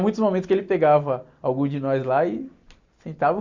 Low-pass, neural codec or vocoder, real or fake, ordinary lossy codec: 7.2 kHz; none; real; Opus, 64 kbps